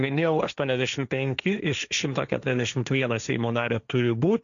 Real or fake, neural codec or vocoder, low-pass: fake; codec, 16 kHz, 1.1 kbps, Voila-Tokenizer; 7.2 kHz